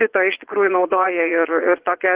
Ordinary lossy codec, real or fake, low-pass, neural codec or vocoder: Opus, 16 kbps; fake; 3.6 kHz; vocoder, 22.05 kHz, 80 mel bands, Vocos